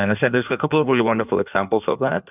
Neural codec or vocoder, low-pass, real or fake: codec, 16 kHz in and 24 kHz out, 1.1 kbps, FireRedTTS-2 codec; 3.6 kHz; fake